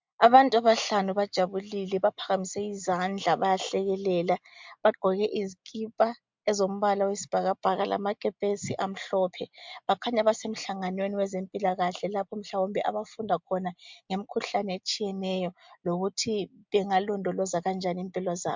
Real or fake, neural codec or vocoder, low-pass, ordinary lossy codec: real; none; 7.2 kHz; MP3, 64 kbps